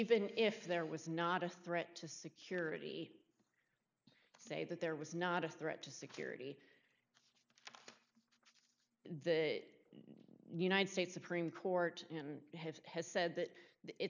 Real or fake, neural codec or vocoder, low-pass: real; none; 7.2 kHz